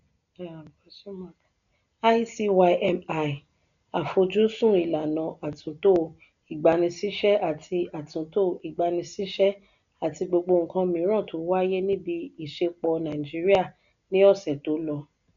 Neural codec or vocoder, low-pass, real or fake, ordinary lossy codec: none; 7.2 kHz; real; none